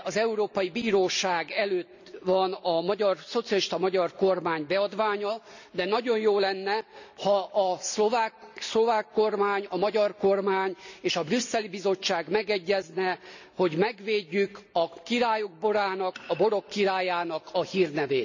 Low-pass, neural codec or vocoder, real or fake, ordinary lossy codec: 7.2 kHz; none; real; none